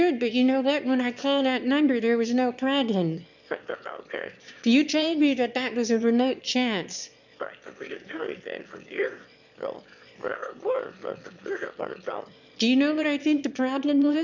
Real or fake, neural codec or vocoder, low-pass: fake; autoencoder, 22.05 kHz, a latent of 192 numbers a frame, VITS, trained on one speaker; 7.2 kHz